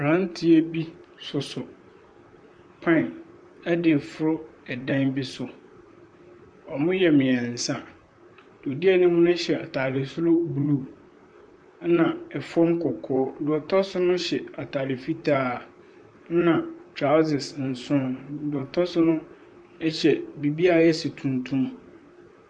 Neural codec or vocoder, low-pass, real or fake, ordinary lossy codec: vocoder, 44.1 kHz, 128 mel bands, Pupu-Vocoder; 9.9 kHz; fake; MP3, 64 kbps